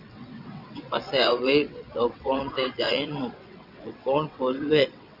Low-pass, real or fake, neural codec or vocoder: 5.4 kHz; fake; vocoder, 22.05 kHz, 80 mel bands, WaveNeXt